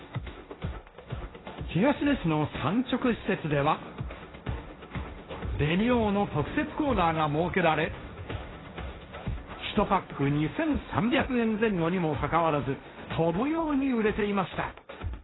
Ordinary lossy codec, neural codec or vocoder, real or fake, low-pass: AAC, 16 kbps; codec, 16 kHz, 1.1 kbps, Voila-Tokenizer; fake; 7.2 kHz